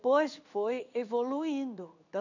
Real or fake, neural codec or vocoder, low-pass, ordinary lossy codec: fake; codec, 16 kHz in and 24 kHz out, 1 kbps, XY-Tokenizer; 7.2 kHz; none